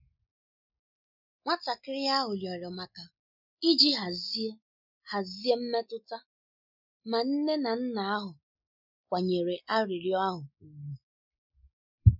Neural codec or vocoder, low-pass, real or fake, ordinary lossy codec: none; 5.4 kHz; real; none